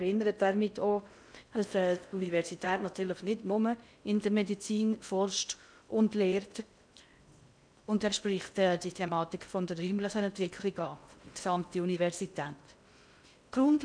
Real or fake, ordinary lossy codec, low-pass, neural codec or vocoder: fake; none; 9.9 kHz; codec, 16 kHz in and 24 kHz out, 0.6 kbps, FocalCodec, streaming, 2048 codes